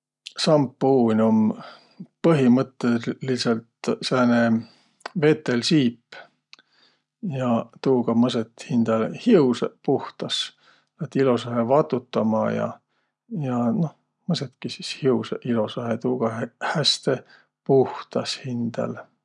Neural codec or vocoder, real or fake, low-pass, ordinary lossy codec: none; real; 10.8 kHz; none